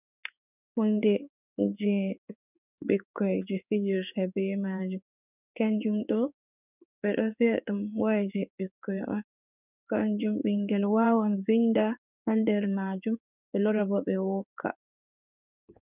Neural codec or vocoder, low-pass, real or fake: codec, 16 kHz in and 24 kHz out, 1 kbps, XY-Tokenizer; 3.6 kHz; fake